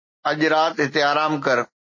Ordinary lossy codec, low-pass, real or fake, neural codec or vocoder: MP3, 32 kbps; 7.2 kHz; real; none